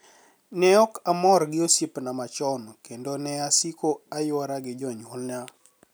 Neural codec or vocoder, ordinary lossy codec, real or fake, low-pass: none; none; real; none